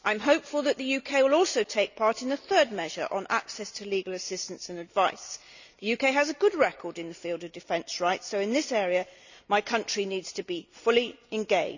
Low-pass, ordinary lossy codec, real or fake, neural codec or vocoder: 7.2 kHz; none; real; none